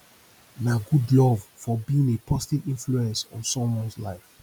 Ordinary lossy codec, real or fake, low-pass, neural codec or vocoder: none; real; 19.8 kHz; none